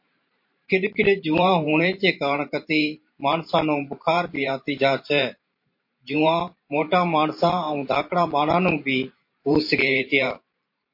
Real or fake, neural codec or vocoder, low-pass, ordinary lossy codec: fake; vocoder, 44.1 kHz, 128 mel bands every 512 samples, BigVGAN v2; 5.4 kHz; MP3, 32 kbps